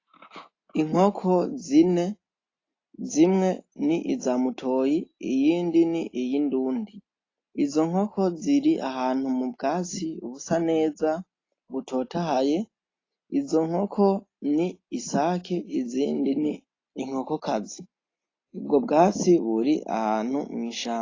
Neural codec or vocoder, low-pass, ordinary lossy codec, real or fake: none; 7.2 kHz; AAC, 32 kbps; real